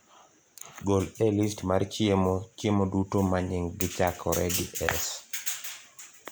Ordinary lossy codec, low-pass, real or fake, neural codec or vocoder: none; none; real; none